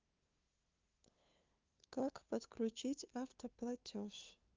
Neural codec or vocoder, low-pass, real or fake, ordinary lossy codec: codec, 16 kHz, 2 kbps, FunCodec, trained on LibriTTS, 25 frames a second; 7.2 kHz; fake; Opus, 24 kbps